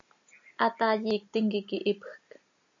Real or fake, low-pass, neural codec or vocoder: real; 7.2 kHz; none